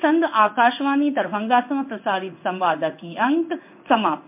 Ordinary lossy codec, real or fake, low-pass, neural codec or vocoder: MP3, 32 kbps; fake; 3.6 kHz; codec, 16 kHz in and 24 kHz out, 1 kbps, XY-Tokenizer